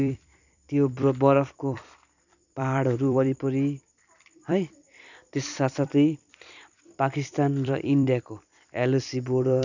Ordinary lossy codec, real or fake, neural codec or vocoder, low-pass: none; fake; vocoder, 44.1 kHz, 128 mel bands every 256 samples, BigVGAN v2; 7.2 kHz